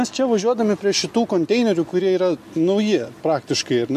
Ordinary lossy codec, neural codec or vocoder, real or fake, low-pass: MP3, 64 kbps; none; real; 14.4 kHz